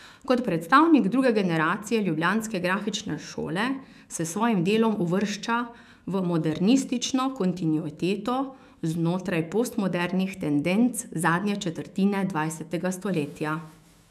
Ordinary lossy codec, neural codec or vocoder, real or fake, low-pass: none; autoencoder, 48 kHz, 128 numbers a frame, DAC-VAE, trained on Japanese speech; fake; 14.4 kHz